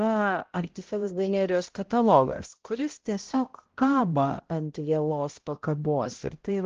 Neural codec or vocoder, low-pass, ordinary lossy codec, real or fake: codec, 16 kHz, 0.5 kbps, X-Codec, HuBERT features, trained on balanced general audio; 7.2 kHz; Opus, 16 kbps; fake